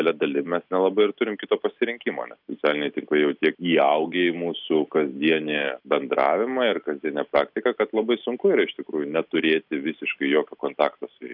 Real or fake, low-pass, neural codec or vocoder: real; 5.4 kHz; none